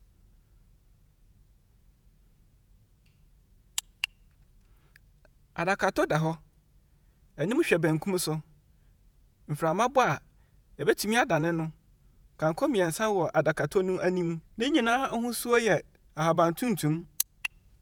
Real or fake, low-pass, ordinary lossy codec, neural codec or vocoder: fake; none; none; vocoder, 48 kHz, 128 mel bands, Vocos